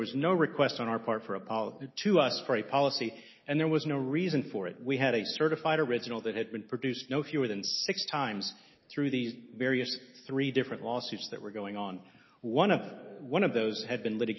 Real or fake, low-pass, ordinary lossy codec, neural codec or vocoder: real; 7.2 kHz; MP3, 24 kbps; none